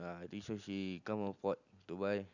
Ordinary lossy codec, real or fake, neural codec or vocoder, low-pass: none; real; none; 7.2 kHz